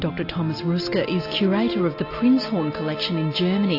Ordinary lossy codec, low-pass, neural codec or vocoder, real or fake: AAC, 32 kbps; 5.4 kHz; none; real